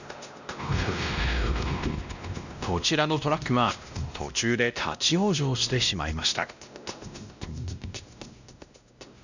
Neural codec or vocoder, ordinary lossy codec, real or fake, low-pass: codec, 16 kHz, 1 kbps, X-Codec, WavLM features, trained on Multilingual LibriSpeech; none; fake; 7.2 kHz